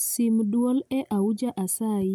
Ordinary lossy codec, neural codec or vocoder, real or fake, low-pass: none; none; real; none